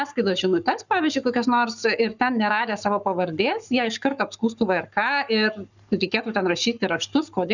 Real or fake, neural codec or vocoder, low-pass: fake; codec, 44.1 kHz, 7.8 kbps, Pupu-Codec; 7.2 kHz